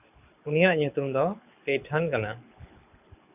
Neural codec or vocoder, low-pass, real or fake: codec, 24 kHz, 6 kbps, HILCodec; 3.6 kHz; fake